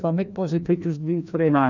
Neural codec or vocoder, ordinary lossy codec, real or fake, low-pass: codec, 16 kHz, 1 kbps, FreqCodec, larger model; none; fake; 7.2 kHz